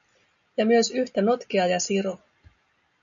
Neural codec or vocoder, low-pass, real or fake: none; 7.2 kHz; real